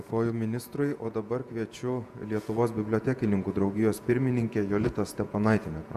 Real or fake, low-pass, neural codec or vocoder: fake; 14.4 kHz; vocoder, 48 kHz, 128 mel bands, Vocos